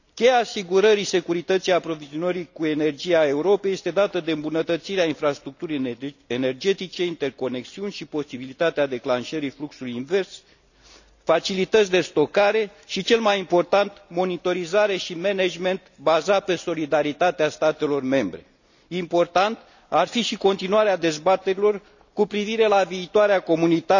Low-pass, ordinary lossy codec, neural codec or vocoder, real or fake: 7.2 kHz; none; none; real